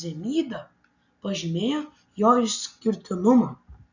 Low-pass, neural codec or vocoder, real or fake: 7.2 kHz; none; real